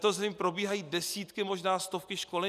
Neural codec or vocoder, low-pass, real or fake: none; 14.4 kHz; real